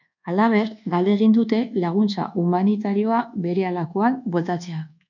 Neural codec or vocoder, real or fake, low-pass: codec, 24 kHz, 1.2 kbps, DualCodec; fake; 7.2 kHz